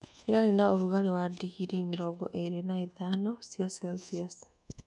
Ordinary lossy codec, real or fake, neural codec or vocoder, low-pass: none; fake; codec, 24 kHz, 1.2 kbps, DualCodec; 10.8 kHz